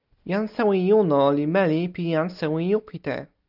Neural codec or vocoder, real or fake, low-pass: none; real; 5.4 kHz